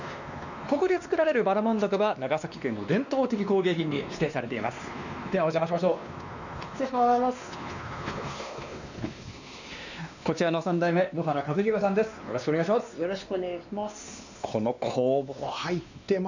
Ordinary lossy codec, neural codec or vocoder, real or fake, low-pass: none; codec, 16 kHz, 2 kbps, X-Codec, WavLM features, trained on Multilingual LibriSpeech; fake; 7.2 kHz